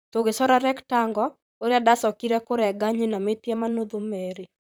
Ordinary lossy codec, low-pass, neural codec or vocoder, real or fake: none; none; vocoder, 44.1 kHz, 128 mel bands, Pupu-Vocoder; fake